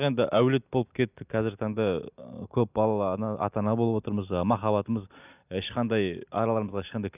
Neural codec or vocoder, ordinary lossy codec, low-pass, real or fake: none; none; 3.6 kHz; real